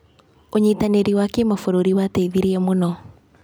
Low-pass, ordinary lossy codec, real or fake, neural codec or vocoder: none; none; real; none